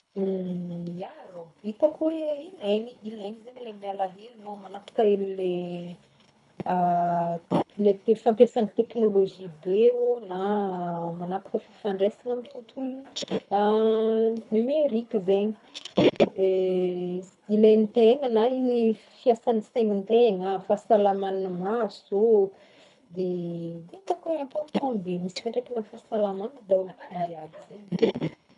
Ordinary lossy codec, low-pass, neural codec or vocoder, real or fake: none; 10.8 kHz; codec, 24 kHz, 3 kbps, HILCodec; fake